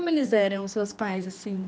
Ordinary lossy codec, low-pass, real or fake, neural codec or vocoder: none; none; fake; codec, 16 kHz, 2 kbps, X-Codec, HuBERT features, trained on general audio